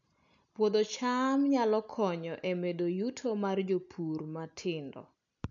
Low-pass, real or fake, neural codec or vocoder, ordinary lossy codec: 7.2 kHz; real; none; none